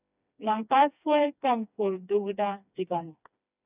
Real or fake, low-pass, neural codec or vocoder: fake; 3.6 kHz; codec, 16 kHz, 2 kbps, FreqCodec, smaller model